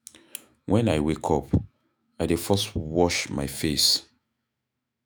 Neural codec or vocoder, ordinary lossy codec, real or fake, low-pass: autoencoder, 48 kHz, 128 numbers a frame, DAC-VAE, trained on Japanese speech; none; fake; none